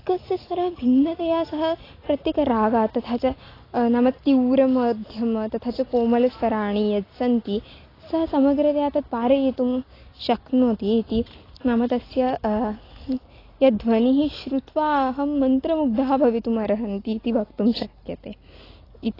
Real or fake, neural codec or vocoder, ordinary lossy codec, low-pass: real; none; AAC, 24 kbps; 5.4 kHz